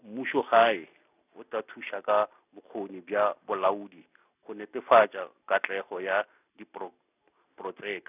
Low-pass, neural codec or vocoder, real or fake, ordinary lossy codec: 3.6 kHz; none; real; none